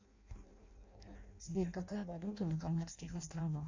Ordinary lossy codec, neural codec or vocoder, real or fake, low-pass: AAC, 48 kbps; codec, 16 kHz in and 24 kHz out, 0.6 kbps, FireRedTTS-2 codec; fake; 7.2 kHz